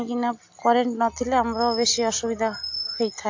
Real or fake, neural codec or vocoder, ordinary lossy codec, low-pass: real; none; AAC, 48 kbps; 7.2 kHz